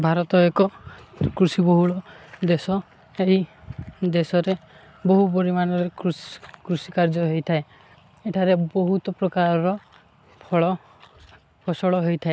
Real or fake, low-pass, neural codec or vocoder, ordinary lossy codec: real; none; none; none